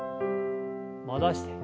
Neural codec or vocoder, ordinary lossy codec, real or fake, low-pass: none; none; real; none